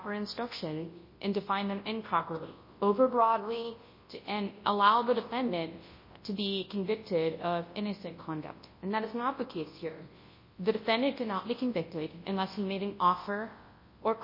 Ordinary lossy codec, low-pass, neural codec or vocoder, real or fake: MP3, 24 kbps; 5.4 kHz; codec, 24 kHz, 0.9 kbps, WavTokenizer, large speech release; fake